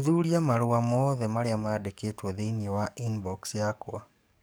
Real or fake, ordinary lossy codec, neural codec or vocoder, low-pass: fake; none; codec, 44.1 kHz, 7.8 kbps, DAC; none